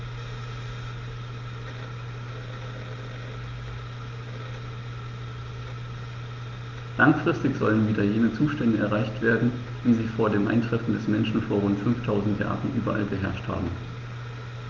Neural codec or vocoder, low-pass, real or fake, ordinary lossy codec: none; 7.2 kHz; real; Opus, 32 kbps